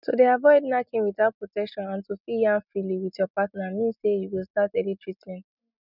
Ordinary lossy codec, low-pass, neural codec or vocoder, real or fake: none; 5.4 kHz; none; real